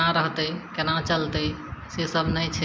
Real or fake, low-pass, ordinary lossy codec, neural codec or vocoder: real; none; none; none